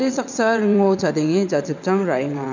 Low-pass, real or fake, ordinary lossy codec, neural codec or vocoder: 7.2 kHz; fake; none; vocoder, 22.05 kHz, 80 mel bands, WaveNeXt